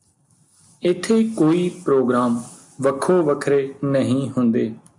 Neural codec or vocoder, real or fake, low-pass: none; real; 10.8 kHz